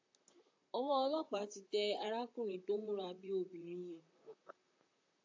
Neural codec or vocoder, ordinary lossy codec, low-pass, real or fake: vocoder, 44.1 kHz, 128 mel bands, Pupu-Vocoder; AAC, 48 kbps; 7.2 kHz; fake